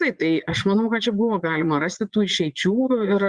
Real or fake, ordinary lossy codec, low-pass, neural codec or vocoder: fake; Opus, 64 kbps; 9.9 kHz; vocoder, 22.05 kHz, 80 mel bands, Vocos